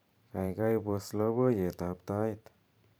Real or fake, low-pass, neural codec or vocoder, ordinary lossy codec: fake; none; vocoder, 44.1 kHz, 128 mel bands every 512 samples, BigVGAN v2; none